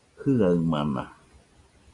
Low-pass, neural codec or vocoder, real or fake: 10.8 kHz; none; real